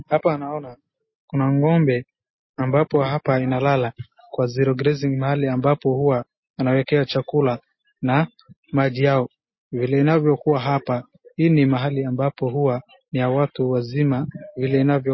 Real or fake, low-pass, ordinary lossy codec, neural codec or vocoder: real; 7.2 kHz; MP3, 24 kbps; none